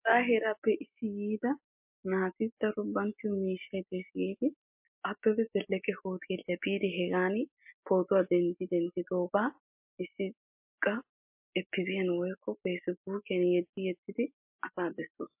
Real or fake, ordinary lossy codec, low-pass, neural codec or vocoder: real; MP3, 32 kbps; 3.6 kHz; none